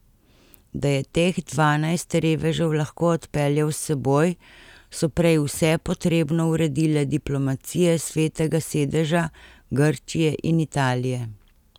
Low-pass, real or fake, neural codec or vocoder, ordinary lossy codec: 19.8 kHz; real; none; none